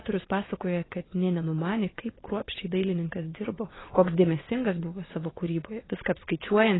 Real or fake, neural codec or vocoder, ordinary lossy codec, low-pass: fake; vocoder, 22.05 kHz, 80 mel bands, WaveNeXt; AAC, 16 kbps; 7.2 kHz